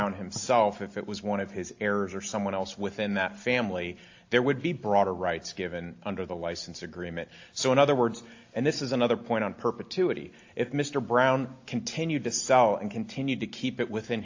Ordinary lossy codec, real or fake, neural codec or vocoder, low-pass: AAC, 48 kbps; real; none; 7.2 kHz